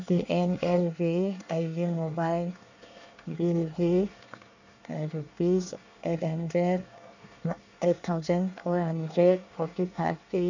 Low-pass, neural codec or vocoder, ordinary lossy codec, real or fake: 7.2 kHz; codec, 24 kHz, 1 kbps, SNAC; none; fake